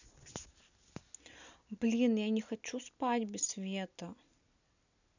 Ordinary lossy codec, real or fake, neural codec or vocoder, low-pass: none; real; none; 7.2 kHz